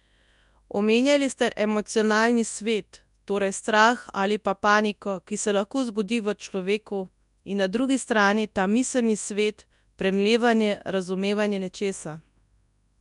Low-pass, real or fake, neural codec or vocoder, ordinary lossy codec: 10.8 kHz; fake; codec, 24 kHz, 0.9 kbps, WavTokenizer, large speech release; none